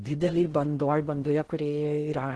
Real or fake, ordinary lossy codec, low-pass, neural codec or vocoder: fake; Opus, 16 kbps; 10.8 kHz; codec, 16 kHz in and 24 kHz out, 0.6 kbps, FocalCodec, streaming, 4096 codes